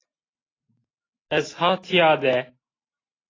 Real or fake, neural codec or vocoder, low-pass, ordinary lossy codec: real; none; 7.2 kHz; AAC, 32 kbps